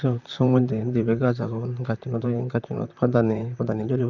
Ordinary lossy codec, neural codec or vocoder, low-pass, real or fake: none; vocoder, 44.1 kHz, 128 mel bands, Pupu-Vocoder; 7.2 kHz; fake